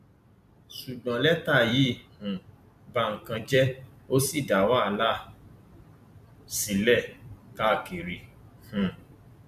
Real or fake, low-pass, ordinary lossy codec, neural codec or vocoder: fake; 14.4 kHz; none; vocoder, 44.1 kHz, 128 mel bands every 256 samples, BigVGAN v2